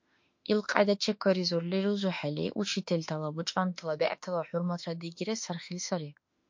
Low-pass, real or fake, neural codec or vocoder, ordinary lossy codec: 7.2 kHz; fake; autoencoder, 48 kHz, 32 numbers a frame, DAC-VAE, trained on Japanese speech; MP3, 48 kbps